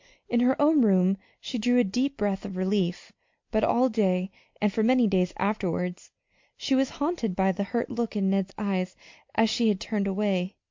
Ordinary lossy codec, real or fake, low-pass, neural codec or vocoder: MP3, 48 kbps; real; 7.2 kHz; none